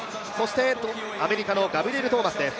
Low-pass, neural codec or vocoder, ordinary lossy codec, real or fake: none; none; none; real